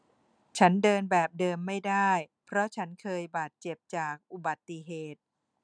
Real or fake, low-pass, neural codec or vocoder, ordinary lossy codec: real; 9.9 kHz; none; none